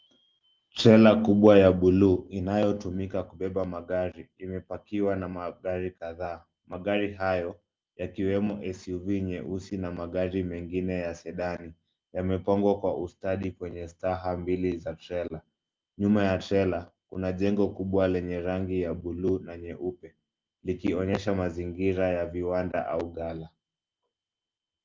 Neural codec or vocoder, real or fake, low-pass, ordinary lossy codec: none; real; 7.2 kHz; Opus, 32 kbps